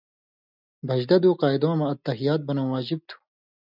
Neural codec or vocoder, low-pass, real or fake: none; 5.4 kHz; real